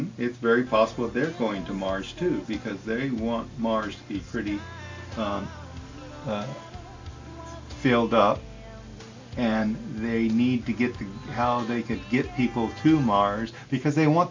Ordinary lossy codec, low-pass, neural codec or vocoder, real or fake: MP3, 64 kbps; 7.2 kHz; none; real